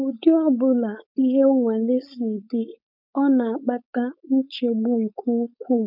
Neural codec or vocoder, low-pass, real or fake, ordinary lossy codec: codec, 16 kHz, 4.8 kbps, FACodec; 5.4 kHz; fake; none